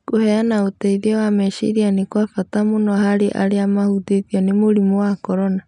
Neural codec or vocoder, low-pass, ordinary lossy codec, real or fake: none; 10.8 kHz; MP3, 96 kbps; real